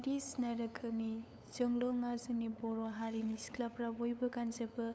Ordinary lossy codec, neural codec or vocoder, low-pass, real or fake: none; codec, 16 kHz, 8 kbps, FunCodec, trained on LibriTTS, 25 frames a second; none; fake